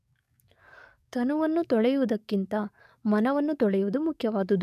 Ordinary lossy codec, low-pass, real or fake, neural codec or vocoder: none; 14.4 kHz; fake; codec, 44.1 kHz, 7.8 kbps, DAC